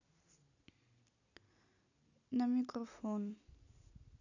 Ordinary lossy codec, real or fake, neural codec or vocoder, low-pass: none; real; none; 7.2 kHz